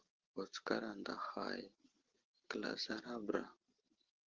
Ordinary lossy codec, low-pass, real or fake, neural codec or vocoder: Opus, 16 kbps; 7.2 kHz; real; none